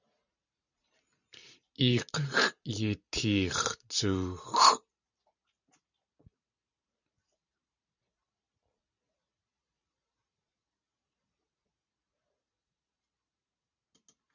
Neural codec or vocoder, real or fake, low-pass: vocoder, 44.1 kHz, 128 mel bands every 512 samples, BigVGAN v2; fake; 7.2 kHz